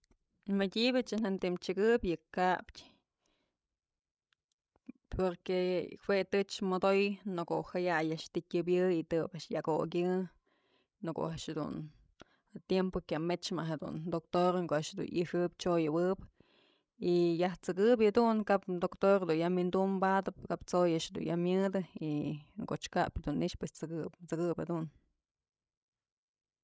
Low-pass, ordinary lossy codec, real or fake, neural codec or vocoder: none; none; fake; codec, 16 kHz, 16 kbps, FreqCodec, larger model